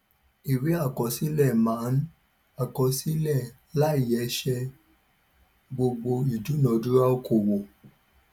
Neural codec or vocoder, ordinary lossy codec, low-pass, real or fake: none; none; 19.8 kHz; real